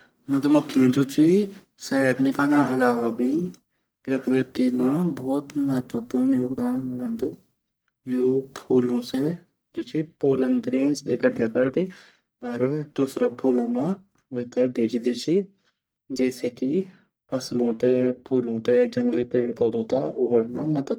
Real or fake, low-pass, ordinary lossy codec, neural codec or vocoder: fake; none; none; codec, 44.1 kHz, 1.7 kbps, Pupu-Codec